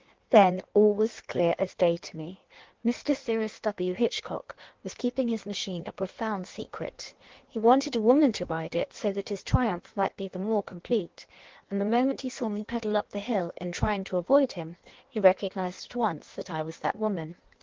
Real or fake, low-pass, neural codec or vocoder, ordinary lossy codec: fake; 7.2 kHz; codec, 16 kHz in and 24 kHz out, 1.1 kbps, FireRedTTS-2 codec; Opus, 16 kbps